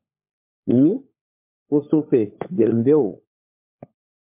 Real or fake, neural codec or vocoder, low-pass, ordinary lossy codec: fake; codec, 16 kHz, 4 kbps, FunCodec, trained on LibriTTS, 50 frames a second; 3.6 kHz; MP3, 32 kbps